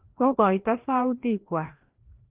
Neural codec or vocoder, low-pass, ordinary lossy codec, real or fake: codec, 16 kHz, 1 kbps, FreqCodec, larger model; 3.6 kHz; Opus, 16 kbps; fake